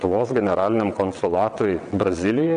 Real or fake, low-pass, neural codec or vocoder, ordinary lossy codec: fake; 9.9 kHz; vocoder, 22.05 kHz, 80 mel bands, WaveNeXt; MP3, 96 kbps